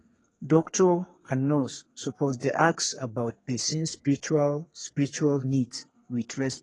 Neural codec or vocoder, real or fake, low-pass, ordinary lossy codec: codec, 32 kHz, 1.9 kbps, SNAC; fake; 10.8 kHz; AAC, 32 kbps